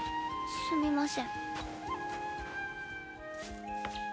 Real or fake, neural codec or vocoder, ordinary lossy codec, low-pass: real; none; none; none